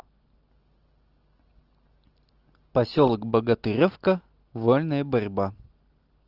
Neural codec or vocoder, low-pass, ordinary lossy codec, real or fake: none; 5.4 kHz; Opus, 16 kbps; real